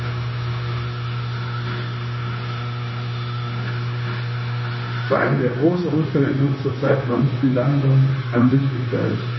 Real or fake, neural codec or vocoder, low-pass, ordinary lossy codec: fake; codec, 16 kHz, 0.9 kbps, LongCat-Audio-Codec; 7.2 kHz; MP3, 24 kbps